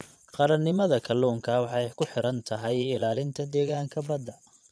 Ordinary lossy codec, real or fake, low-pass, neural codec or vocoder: none; fake; none; vocoder, 22.05 kHz, 80 mel bands, Vocos